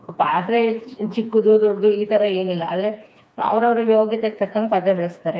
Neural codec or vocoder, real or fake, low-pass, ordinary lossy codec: codec, 16 kHz, 2 kbps, FreqCodec, smaller model; fake; none; none